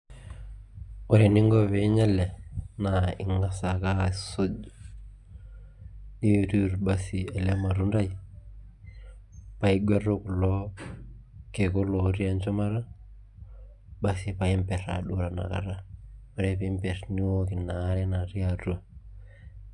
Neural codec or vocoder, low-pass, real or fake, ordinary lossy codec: none; 10.8 kHz; real; none